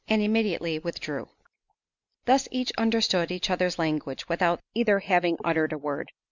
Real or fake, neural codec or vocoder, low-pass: real; none; 7.2 kHz